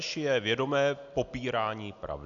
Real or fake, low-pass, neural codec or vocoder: real; 7.2 kHz; none